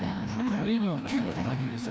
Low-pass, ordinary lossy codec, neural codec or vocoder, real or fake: none; none; codec, 16 kHz, 1 kbps, FreqCodec, larger model; fake